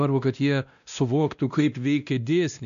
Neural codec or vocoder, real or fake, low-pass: codec, 16 kHz, 1 kbps, X-Codec, WavLM features, trained on Multilingual LibriSpeech; fake; 7.2 kHz